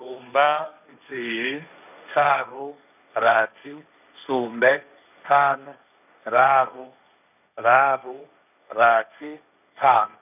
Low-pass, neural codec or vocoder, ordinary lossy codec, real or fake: 3.6 kHz; codec, 16 kHz, 1.1 kbps, Voila-Tokenizer; none; fake